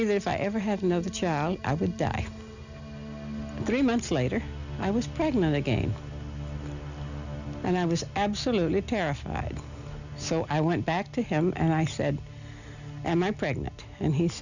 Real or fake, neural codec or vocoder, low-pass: real; none; 7.2 kHz